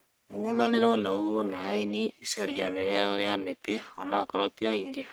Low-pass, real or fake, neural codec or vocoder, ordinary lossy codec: none; fake; codec, 44.1 kHz, 1.7 kbps, Pupu-Codec; none